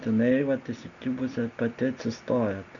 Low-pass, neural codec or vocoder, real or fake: 7.2 kHz; none; real